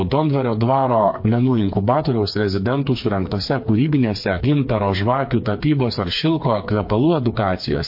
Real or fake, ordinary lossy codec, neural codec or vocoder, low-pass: fake; MP3, 32 kbps; codec, 16 kHz, 4 kbps, FreqCodec, smaller model; 5.4 kHz